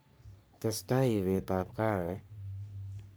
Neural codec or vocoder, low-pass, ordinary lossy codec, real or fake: codec, 44.1 kHz, 3.4 kbps, Pupu-Codec; none; none; fake